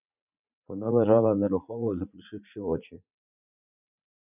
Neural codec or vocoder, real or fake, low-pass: vocoder, 44.1 kHz, 80 mel bands, Vocos; fake; 3.6 kHz